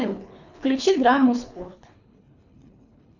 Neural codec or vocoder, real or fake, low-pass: codec, 24 kHz, 3 kbps, HILCodec; fake; 7.2 kHz